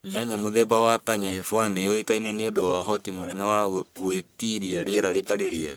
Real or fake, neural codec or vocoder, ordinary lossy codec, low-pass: fake; codec, 44.1 kHz, 1.7 kbps, Pupu-Codec; none; none